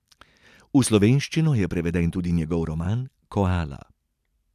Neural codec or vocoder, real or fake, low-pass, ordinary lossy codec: none; real; 14.4 kHz; none